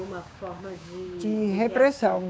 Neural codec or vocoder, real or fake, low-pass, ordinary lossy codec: codec, 16 kHz, 6 kbps, DAC; fake; none; none